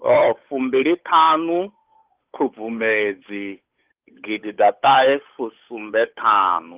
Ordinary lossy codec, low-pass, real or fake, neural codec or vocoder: Opus, 32 kbps; 3.6 kHz; fake; codec, 16 kHz, 8 kbps, FunCodec, trained on Chinese and English, 25 frames a second